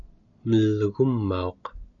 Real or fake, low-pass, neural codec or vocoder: real; 7.2 kHz; none